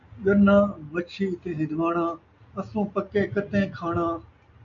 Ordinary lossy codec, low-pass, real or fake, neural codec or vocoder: AAC, 64 kbps; 7.2 kHz; real; none